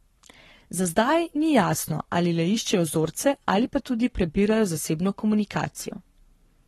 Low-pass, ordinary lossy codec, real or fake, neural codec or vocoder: 19.8 kHz; AAC, 32 kbps; fake; codec, 44.1 kHz, 7.8 kbps, Pupu-Codec